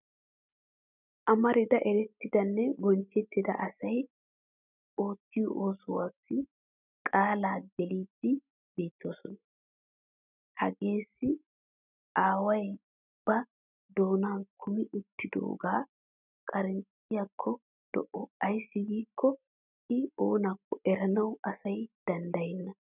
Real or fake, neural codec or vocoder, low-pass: real; none; 3.6 kHz